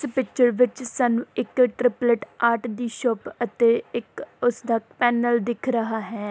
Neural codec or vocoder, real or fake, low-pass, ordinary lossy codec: none; real; none; none